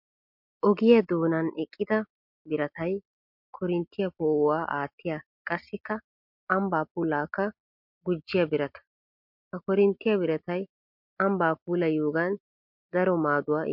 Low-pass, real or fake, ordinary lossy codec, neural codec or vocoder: 5.4 kHz; real; MP3, 48 kbps; none